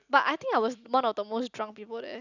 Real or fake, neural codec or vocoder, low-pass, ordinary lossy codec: real; none; 7.2 kHz; none